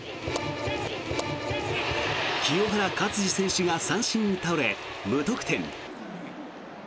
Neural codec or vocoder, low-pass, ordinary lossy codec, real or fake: none; none; none; real